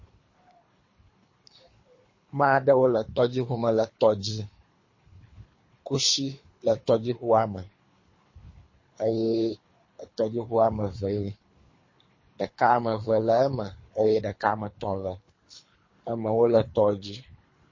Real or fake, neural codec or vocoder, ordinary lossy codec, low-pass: fake; codec, 24 kHz, 3 kbps, HILCodec; MP3, 32 kbps; 7.2 kHz